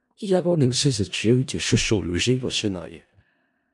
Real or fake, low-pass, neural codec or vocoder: fake; 10.8 kHz; codec, 16 kHz in and 24 kHz out, 0.4 kbps, LongCat-Audio-Codec, four codebook decoder